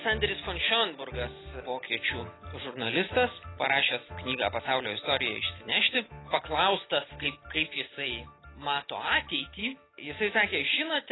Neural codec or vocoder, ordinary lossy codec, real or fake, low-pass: none; AAC, 16 kbps; real; 7.2 kHz